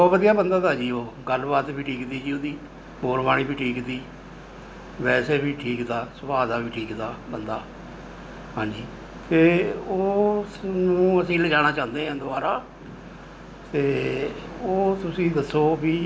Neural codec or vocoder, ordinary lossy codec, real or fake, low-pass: none; none; real; none